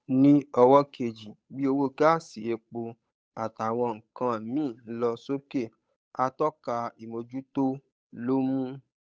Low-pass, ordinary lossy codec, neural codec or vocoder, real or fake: none; none; codec, 16 kHz, 8 kbps, FunCodec, trained on Chinese and English, 25 frames a second; fake